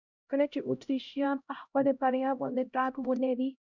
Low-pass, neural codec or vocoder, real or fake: 7.2 kHz; codec, 16 kHz, 0.5 kbps, X-Codec, HuBERT features, trained on LibriSpeech; fake